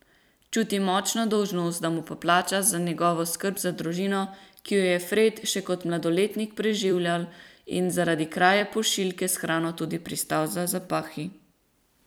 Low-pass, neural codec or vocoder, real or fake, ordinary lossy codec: none; vocoder, 44.1 kHz, 128 mel bands every 256 samples, BigVGAN v2; fake; none